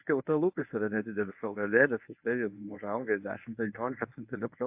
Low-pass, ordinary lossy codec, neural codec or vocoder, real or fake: 3.6 kHz; AAC, 32 kbps; codec, 24 kHz, 0.9 kbps, WavTokenizer, medium speech release version 1; fake